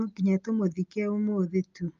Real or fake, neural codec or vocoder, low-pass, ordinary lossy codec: real; none; 7.2 kHz; Opus, 24 kbps